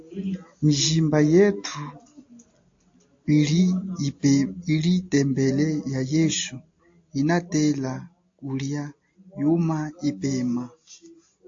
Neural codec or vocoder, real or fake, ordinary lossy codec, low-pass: none; real; AAC, 48 kbps; 7.2 kHz